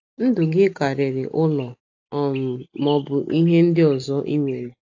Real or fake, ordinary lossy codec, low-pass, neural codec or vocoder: real; AAC, 48 kbps; 7.2 kHz; none